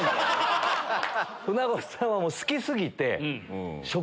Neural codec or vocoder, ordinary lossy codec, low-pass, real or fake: none; none; none; real